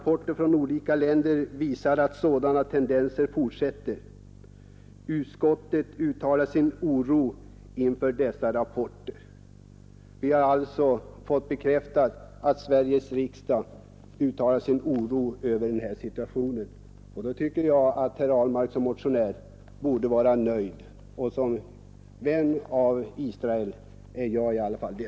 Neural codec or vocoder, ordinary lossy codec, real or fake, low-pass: none; none; real; none